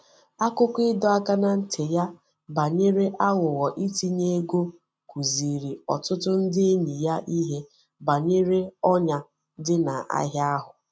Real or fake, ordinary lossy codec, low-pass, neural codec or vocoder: real; none; none; none